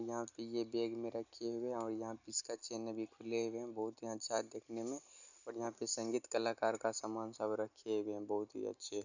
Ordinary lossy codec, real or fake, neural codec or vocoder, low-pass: none; real; none; 7.2 kHz